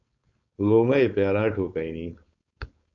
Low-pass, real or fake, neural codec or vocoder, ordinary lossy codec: 7.2 kHz; fake; codec, 16 kHz, 4.8 kbps, FACodec; AAC, 64 kbps